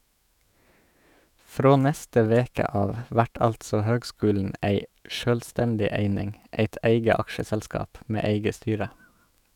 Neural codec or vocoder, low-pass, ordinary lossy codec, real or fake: autoencoder, 48 kHz, 128 numbers a frame, DAC-VAE, trained on Japanese speech; 19.8 kHz; none; fake